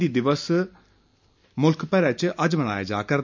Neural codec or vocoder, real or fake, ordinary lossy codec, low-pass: none; real; MP3, 64 kbps; 7.2 kHz